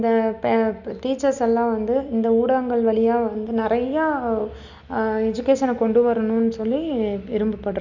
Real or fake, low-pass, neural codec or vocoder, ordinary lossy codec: real; 7.2 kHz; none; none